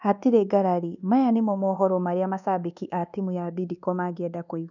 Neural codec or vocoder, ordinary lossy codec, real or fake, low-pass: codec, 24 kHz, 1.2 kbps, DualCodec; none; fake; 7.2 kHz